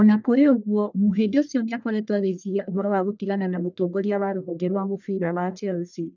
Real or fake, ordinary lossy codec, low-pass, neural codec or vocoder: fake; none; 7.2 kHz; codec, 44.1 kHz, 1.7 kbps, Pupu-Codec